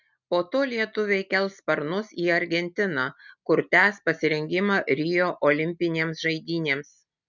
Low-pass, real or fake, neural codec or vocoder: 7.2 kHz; real; none